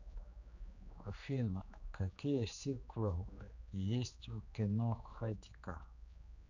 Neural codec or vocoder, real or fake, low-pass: codec, 16 kHz, 2 kbps, X-Codec, HuBERT features, trained on general audio; fake; 7.2 kHz